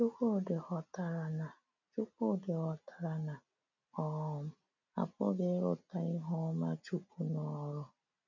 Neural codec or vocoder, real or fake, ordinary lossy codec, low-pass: none; real; none; 7.2 kHz